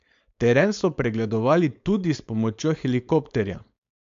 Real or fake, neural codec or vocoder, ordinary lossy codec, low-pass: fake; codec, 16 kHz, 4.8 kbps, FACodec; AAC, 64 kbps; 7.2 kHz